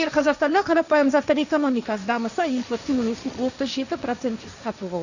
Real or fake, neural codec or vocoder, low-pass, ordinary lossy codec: fake; codec, 16 kHz, 1.1 kbps, Voila-Tokenizer; 7.2 kHz; none